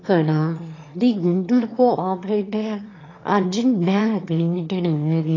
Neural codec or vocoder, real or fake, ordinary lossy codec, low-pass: autoencoder, 22.05 kHz, a latent of 192 numbers a frame, VITS, trained on one speaker; fake; AAC, 32 kbps; 7.2 kHz